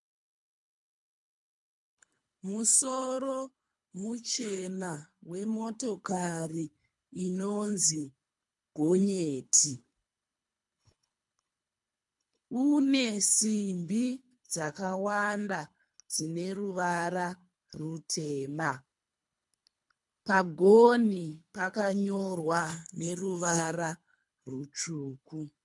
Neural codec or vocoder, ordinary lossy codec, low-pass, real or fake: codec, 24 kHz, 3 kbps, HILCodec; MP3, 64 kbps; 10.8 kHz; fake